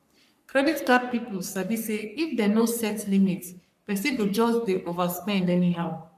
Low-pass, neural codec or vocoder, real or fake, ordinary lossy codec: 14.4 kHz; codec, 44.1 kHz, 3.4 kbps, Pupu-Codec; fake; none